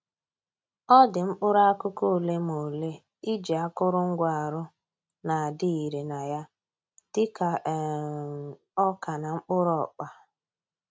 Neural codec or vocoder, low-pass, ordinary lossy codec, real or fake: none; none; none; real